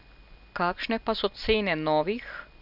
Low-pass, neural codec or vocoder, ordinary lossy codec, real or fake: 5.4 kHz; none; none; real